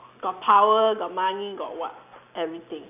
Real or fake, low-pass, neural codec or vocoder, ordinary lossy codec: real; 3.6 kHz; none; none